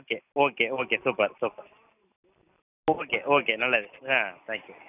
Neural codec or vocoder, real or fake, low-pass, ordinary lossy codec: none; real; 3.6 kHz; none